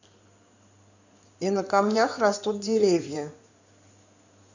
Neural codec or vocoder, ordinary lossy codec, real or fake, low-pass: codec, 16 kHz in and 24 kHz out, 2.2 kbps, FireRedTTS-2 codec; none; fake; 7.2 kHz